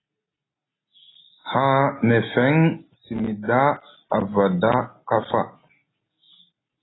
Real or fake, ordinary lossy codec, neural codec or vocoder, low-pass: real; AAC, 16 kbps; none; 7.2 kHz